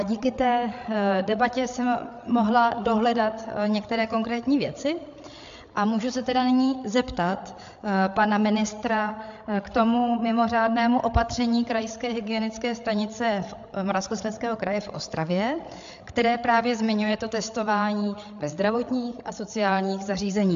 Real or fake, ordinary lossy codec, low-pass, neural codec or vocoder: fake; AAC, 64 kbps; 7.2 kHz; codec, 16 kHz, 8 kbps, FreqCodec, larger model